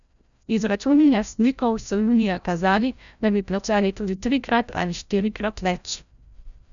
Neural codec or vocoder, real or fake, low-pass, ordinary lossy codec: codec, 16 kHz, 0.5 kbps, FreqCodec, larger model; fake; 7.2 kHz; none